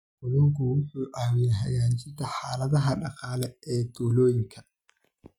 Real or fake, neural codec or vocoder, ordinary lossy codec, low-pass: real; none; none; 19.8 kHz